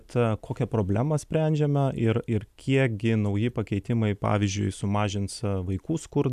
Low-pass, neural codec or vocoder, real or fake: 14.4 kHz; none; real